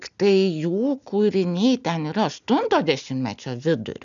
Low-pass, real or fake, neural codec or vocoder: 7.2 kHz; real; none